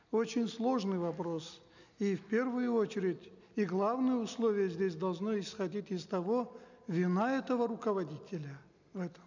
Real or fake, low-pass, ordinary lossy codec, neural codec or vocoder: real; 7.2 kHz; none; none